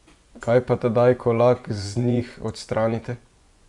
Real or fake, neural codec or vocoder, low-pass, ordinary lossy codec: fake; vocoder, 24 kHz, 100 mel bands, Vocos; 10.8 kHz; none